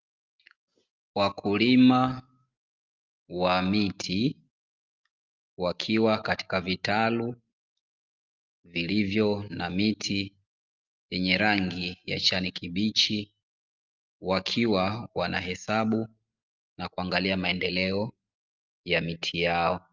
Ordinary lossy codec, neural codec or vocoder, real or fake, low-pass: Opus, 32 kbps; none; real; 7.2 kHz